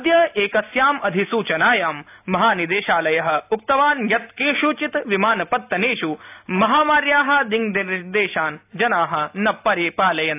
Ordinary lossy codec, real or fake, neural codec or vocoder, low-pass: AAC, 32 kbps; real; none; 3.6 kHz